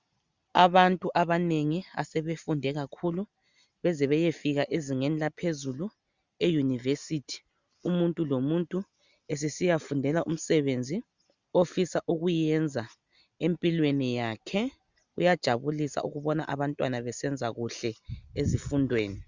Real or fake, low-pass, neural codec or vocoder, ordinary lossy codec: real; 7.2 kHz; none; Opus, 64 kbps